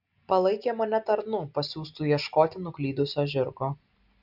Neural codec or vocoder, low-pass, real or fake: none; 5.4 kHz; real